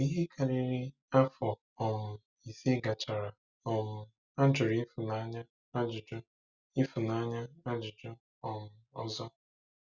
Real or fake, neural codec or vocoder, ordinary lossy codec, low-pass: real; none; Opus, 64 kbps; 7.2 kHz